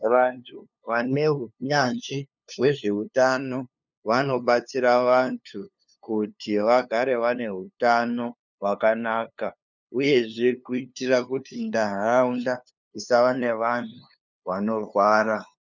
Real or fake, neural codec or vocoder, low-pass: fake; codec, 16 kHz, 2 kbps, FunCodec, trained on LibriTTS, 25 frames a second; 7.2 kHz